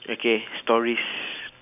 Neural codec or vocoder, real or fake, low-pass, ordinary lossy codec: none; real; 3.6 kHz; none